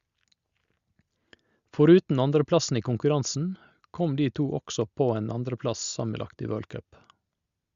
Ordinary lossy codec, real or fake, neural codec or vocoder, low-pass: Opus, 64 kbps; real; none; 7.2 kHz